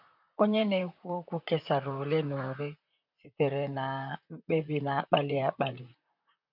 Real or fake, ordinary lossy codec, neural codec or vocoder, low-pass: fake; none; vocoder, 44.1 kHz, 128 mel bands, Pupu-Vocoder; 5.4 kHz